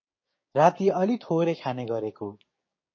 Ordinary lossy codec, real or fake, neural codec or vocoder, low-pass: MP3, 32 kbps; fake; codec, 16 kHz, 6 kbps, DAC; 7.2 kHz